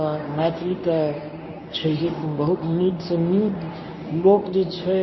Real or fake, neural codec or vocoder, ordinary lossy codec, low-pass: fake; codec, 24 kHz, 0.9 kbps, WavTokenizer, medium speech release version 1; MP3, 24 kbps; 7.2 kHz